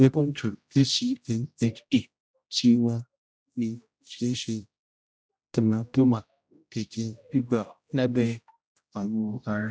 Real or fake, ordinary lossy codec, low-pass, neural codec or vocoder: fake; none; none; codec, 16 kHz, 0.5 kbps, X-Codec, HuBERT features, trained on general audio